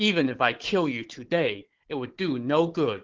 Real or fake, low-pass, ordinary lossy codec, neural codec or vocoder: fake; 7.2 kHz; Opus, 16 kbps; codec, 16 kHz, 4 kbps, FunCodec, trained on Chinese and English, 50 frames a second